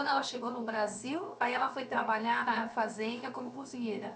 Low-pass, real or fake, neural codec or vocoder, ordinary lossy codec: none; fake; codec, 16 kHz, 0.7 kbps, FocalCodec; none